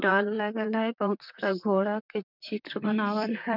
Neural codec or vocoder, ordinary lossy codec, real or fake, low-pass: vocoder, 44.1 kHz, 128 mel bands, Pupu-Vocoder; none; fake; 5.4 kHz